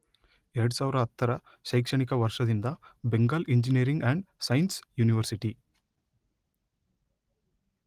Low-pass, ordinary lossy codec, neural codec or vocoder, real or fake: 14.4 kHz; Opus, 24 kbps; none; real